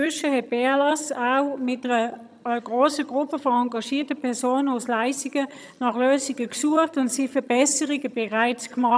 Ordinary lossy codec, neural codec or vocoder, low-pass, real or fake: none; vocoder, 22.05 kHz, 80 mel bands, HiFi-GAN; none; fake